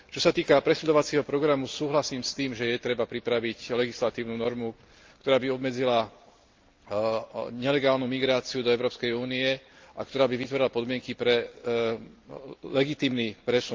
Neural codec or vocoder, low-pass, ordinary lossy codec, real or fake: none; 7.2 kHz; Opus, 24 kbps; real